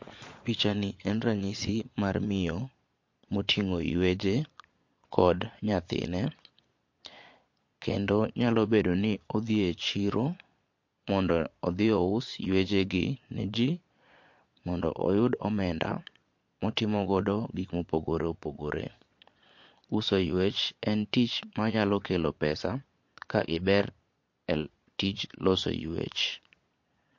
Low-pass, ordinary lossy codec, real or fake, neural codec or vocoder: 7.2 kHz; MP3, 48 kbps; fake; vocoder, 22.05 kHz, 80 mel bands, Vocos